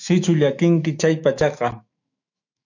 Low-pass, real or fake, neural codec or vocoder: 7.2 kHz; fake; autoencoder, 48 kHz, 128 numbers a frame, DAC-VAE, trained on Japanese speech